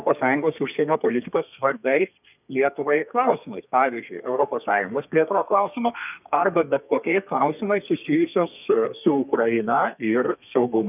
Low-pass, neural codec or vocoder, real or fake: 3.6 kHz; codec, 32 kHz, 1.9 kbps, SNAC; fake